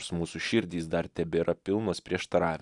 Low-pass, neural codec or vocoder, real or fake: 10.8 kHz; none; real